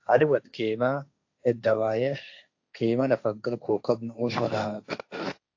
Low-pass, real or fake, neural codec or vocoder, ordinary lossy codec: 7.2 kHz; fake; codec, 16 kHz, 1.1 kbps, Voila-Tokenizer; AAC, 48 kbps